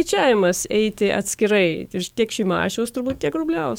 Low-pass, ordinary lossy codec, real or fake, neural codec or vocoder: 19.8 kHz; MP3, 96 kbps; fake; codec, 44.1 kHz, 7.8 kbps, DAC